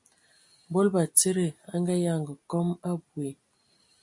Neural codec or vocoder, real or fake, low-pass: none; real; 10.8 kHz